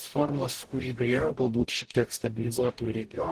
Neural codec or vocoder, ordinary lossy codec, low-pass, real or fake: codec, 44.1 kHz, 0.9 kbps, DAC; Opus, 16 kbps; 14.4 kHz; fake